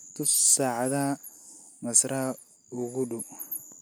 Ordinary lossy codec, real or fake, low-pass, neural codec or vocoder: none; real; none; none